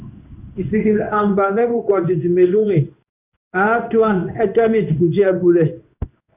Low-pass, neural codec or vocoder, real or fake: 3.6 kHz; codec, 16 kHz in and 24 kHz out, 1 kbps, XY-Tokenizer; fake